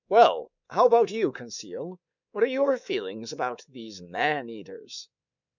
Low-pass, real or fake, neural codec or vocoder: 7.2 kHz; fake; codec, 24 kHz, 3.1 kbps, DualCodec